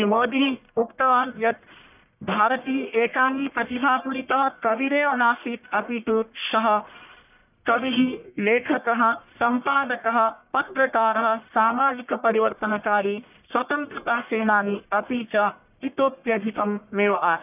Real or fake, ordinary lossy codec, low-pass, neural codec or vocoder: fake; none; 3.6 kHz; codec, 44.1 kHz, 1.7 kbps, Pupu-Codec